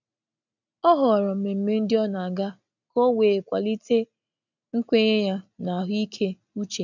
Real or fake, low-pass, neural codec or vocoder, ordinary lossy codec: real; 7.2 kHz; none; none